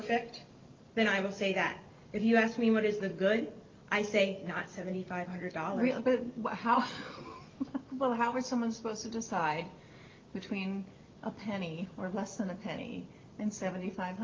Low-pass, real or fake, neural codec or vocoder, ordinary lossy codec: 7.2 kHz; real; none; Opus, 32 kbps